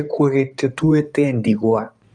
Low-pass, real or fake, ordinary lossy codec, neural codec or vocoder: 9.9 kHz; fake; none; codec, 16 kHz in and 24 kHz out, 2.2 kbps, FireRedTTS-2 codec